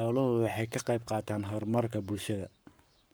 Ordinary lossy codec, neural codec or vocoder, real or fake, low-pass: none; codec, 44.1 kHz, 7.8 kbps, Pupu-Codec; fake; none